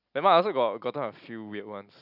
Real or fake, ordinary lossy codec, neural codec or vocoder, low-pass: real; none; none; 5.4 kHz